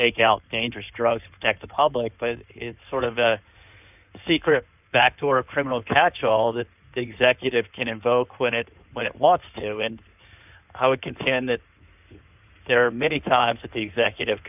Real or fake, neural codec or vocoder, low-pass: fake; codec, 16 kHz in and 24 kHz out, 2.2 kbps, FireRedTTS-2 codec; 3.6 kHz